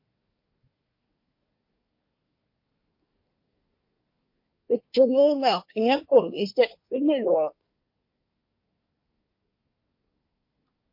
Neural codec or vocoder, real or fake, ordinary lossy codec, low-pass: codec, 24 kHz, 1 kbps, SNAC; fake; MP3, 32 kbps; 5.4 kHz